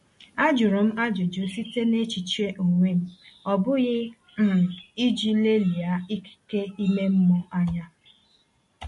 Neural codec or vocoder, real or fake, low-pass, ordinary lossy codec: none; real; 14.4 kHz; MP3, 48 kbps